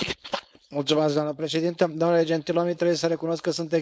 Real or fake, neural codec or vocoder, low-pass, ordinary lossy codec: fake; codec, 16 kHz, 4.8 kbps, FACodec; none; none